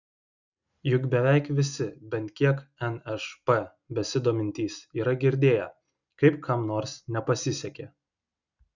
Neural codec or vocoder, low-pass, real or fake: none; 7.2 kHz; real